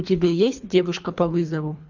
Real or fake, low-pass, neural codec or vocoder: fake; 7.2 kHz; codec, 24 kHz, 3 kbps, HILCodec